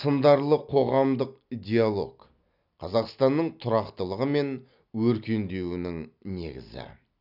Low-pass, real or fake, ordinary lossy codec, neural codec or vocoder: 5.4 kHz; real; none; none